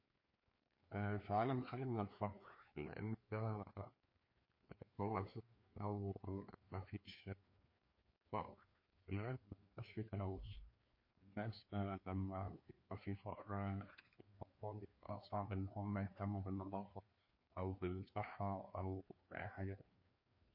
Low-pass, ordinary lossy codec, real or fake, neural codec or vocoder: 5.4 kHz; MP3, 32 kbps; fake; codec, 16 kHz, 2 kbps, FreqCodec, larger model